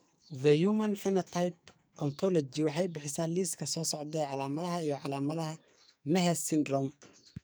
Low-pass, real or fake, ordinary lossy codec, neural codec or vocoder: none; fake; none; codec, 44.1 kHz, 2.6 kbps, SNAC